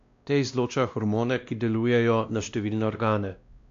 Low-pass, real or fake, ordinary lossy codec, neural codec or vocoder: 7.2 kHz; fake; AAC, 64 kbps; codec, 16 kHz, 1 kbps, X-Codec, WavLM features, trained on Multilingual LibriSpeech